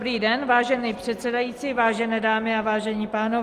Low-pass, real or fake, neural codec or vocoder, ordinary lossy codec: 14.4 kHz; real; none; Opus, 24 kbps